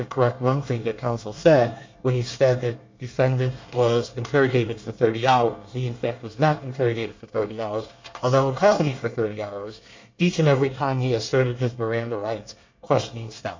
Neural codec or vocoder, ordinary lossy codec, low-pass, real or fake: codec, 24 kHz, 1 kbps, SNAC; MP3, 64 kbps; 7.2 kHz; fake